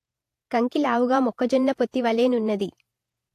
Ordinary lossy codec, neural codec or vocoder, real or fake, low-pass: AAC, 64 kbps; vocoder, 48 kHz, 128 mel bands, Vocos; fake; 14.4 kHz